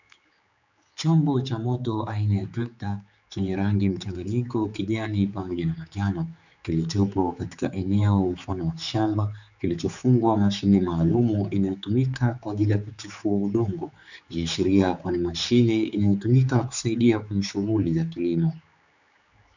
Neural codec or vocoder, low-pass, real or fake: codec, 16 kHz, 4 kbps, X-Codec, HuBERT features, trained on general audio; 7.2 kHz; fake